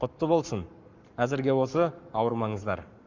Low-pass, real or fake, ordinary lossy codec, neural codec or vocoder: 7.2 kHz; fake; Opus, 64 kbps; codec, 44.1 kHz, 7.8 kbps, Pupu-Codec